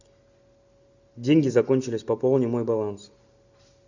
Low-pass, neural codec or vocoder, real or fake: 7.2 kHz; none; real